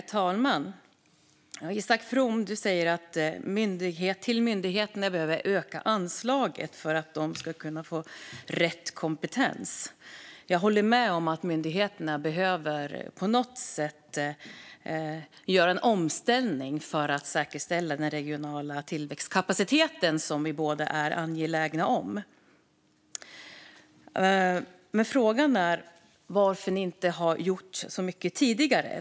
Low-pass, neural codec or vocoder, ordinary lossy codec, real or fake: none; none; none; real